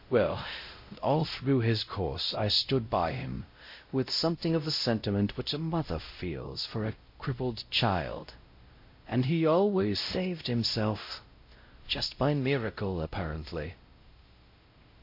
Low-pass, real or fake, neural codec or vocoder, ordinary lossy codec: 5.4 kHz; fake; codec, 16 kHz, 0.5 kbps, X-Codec, WavLM features, trained on Multilingual LibriSpeech; MP3, 32 kbps